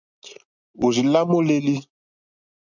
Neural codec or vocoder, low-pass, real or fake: none; 7.2 kHz; real